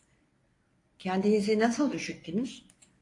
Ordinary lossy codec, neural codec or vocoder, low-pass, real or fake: AAC, 48 kbps; codec, 24 kHz, 0.9 kbps, WavTokenizer, medium speech release version 1; 10.8 kHz; fake